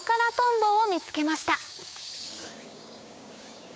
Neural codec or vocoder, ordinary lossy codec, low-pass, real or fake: codec, 16 kHz, 6 kbps, DAC; none; none; fake